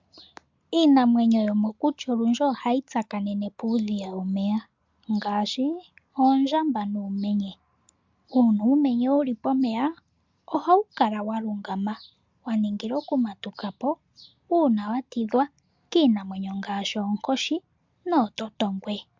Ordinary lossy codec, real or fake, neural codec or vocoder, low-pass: MP3, 64 kbps; real; none; 7.2 kHz